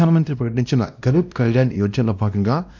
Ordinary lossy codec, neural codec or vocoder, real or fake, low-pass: none; codec, 16 kHz, 1 kbps, X-Codec, WavLM features, trained on Multilingual LibriSpeech; fake; 7.2 kHz